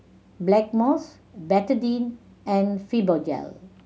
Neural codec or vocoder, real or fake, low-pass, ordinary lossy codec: none; real; none; none